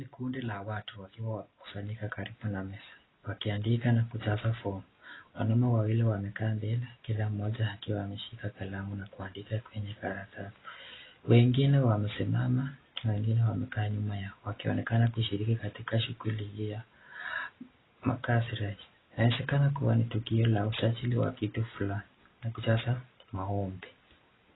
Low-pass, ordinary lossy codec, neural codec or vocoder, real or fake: 7.2 kHz; AAC, 16 kbps; none; real